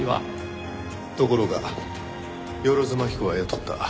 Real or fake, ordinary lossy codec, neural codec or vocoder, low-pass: real; none; none; none